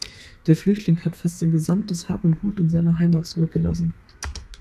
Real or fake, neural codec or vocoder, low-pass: fake; codec, 32 kHz, 1.9 kbps, SNAC; 14.4 kHz